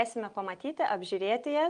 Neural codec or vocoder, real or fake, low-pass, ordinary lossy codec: none; real; 9.9 kHz; Opus, 32 kbps